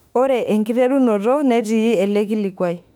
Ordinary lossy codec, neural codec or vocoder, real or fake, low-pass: none; autoencoder, 48 kHz, 32 numbers a frame, DAC-VAE, trained on Japanese speech; fake; 19.8 kHz